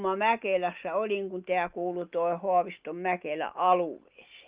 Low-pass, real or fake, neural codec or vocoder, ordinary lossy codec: 3.6 kHz; real; none; Opus, 32 kbps